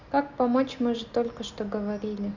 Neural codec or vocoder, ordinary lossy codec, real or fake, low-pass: none; Opus, 64 kbps; real; 7.2 kHz